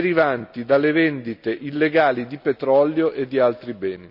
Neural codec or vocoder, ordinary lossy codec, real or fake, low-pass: none; none; real; 5.4 kHz